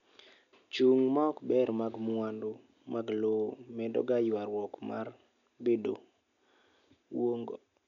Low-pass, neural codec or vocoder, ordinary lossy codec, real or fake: 7.2 kHz; none; none; real